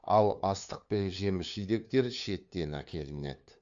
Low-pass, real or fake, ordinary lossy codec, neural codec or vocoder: 7.2 kHz; fake; AAC, 64 kbps; codec, 16 kHz, 2 kbps, FunCodec, trained on LibriTTS, 25 frames a second